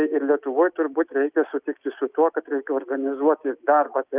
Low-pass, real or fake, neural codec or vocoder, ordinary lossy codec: 3.6 kHz; real; none; Opus, 32 kbps